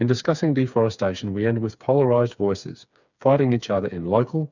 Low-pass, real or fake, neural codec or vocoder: 7.2 kHz; fake; codec, 16 kHz, 4 kbps, FreqCodec, smaller model